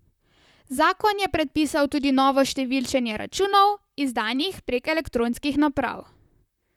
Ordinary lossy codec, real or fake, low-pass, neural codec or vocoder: none; fake; 19.8 kHz; vocoder, 44.1 kHz, 128 mel bands, Pupu-Vocoder